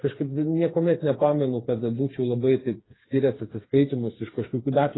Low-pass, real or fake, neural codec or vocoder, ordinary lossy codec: 7.2 kHz; fake; codec, 16 kHz, 4 kbps, FreqCodec, smaller model; AAC, 16 kbps